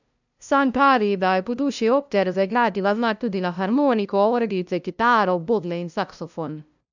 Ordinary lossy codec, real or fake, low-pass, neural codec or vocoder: none; fake; 7.2 kHz; codec, 16 kHz, 0.5 kbps, FunCodec, trained on LibriTTS, 25 frames a second